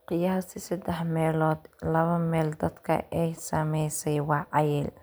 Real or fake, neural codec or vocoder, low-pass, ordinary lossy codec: fake; vocoder, 44.1 kHz, 128 mel bands every 256 samples, BigVGAN v2; none; none